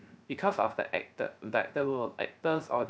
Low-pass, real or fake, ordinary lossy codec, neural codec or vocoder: none; fake; none; codec, 16 kHz, 0.3 kbps, FocalCodec